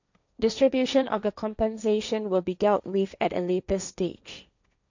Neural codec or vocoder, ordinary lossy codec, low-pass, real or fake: codec, 16 kHz, 1.1 kbps, Voila-Tokenizer; none; 7.2 kHz; fake